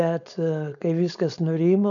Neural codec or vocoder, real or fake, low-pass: codec, 16 kHz, 4.8 kbps, FACodec; fake; 7.2 kHz